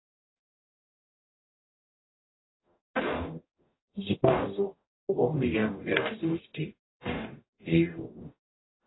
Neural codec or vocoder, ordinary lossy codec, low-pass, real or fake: codec, 44.1 kHz, 0.9 kbps, DAC; AAC, 16 kbps; 7.2 kHz; fake